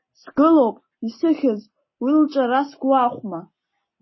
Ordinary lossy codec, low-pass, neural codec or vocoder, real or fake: MP3, 24 kbps; 7.2 kHz; none; real